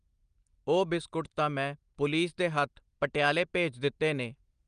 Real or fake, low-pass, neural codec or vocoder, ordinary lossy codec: real; 9.9 kHz; none; Opus, 32 kbps